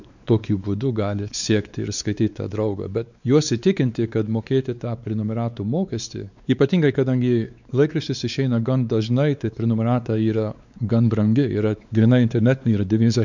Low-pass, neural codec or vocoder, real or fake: 7.2 kHz; codec, 16 kHz, 4 kbps, X-Codec, WavLM features, trained on Multilingual LibriSpeech; fake